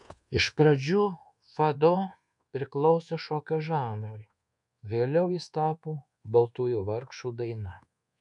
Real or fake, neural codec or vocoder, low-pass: fake; codec, 24 kHz, 1.2 kbps, DualCodec; 10.8 kHz